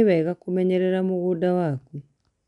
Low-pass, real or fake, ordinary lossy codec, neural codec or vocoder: 10.8 kHz; real; none; none